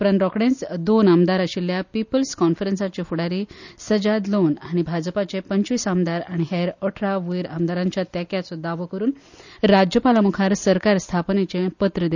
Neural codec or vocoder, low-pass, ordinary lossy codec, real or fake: none; 7.2 kHz; none; real